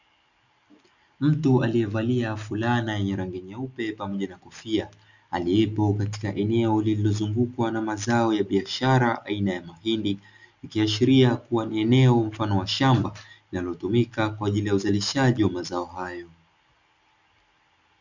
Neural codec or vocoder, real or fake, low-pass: none; real; 7.2 kHz